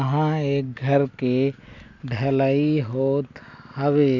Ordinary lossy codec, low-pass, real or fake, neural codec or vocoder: none; 7.2 kHz; real; none